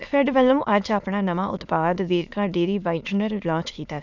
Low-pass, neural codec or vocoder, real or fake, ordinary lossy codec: 7.2 kHz; autoencoder, 22.05 kHz, a latent of 192 numbers a frame, VITS, trained on many speakers; fake; none